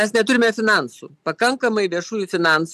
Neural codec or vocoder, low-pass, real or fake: none; 14.4 kHz; real